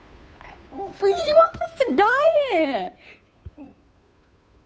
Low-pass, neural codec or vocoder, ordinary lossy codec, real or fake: none; codec, 16 kHz, 2 kbps, FunCodec, trained on Chinese and English, 25 frames a second; none; fake